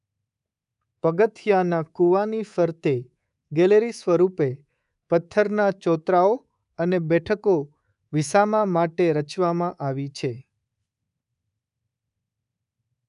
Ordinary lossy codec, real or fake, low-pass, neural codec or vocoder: none; fake; 10.8 kHz; codec, 24 kHz, 3.1 kbps, DualCodec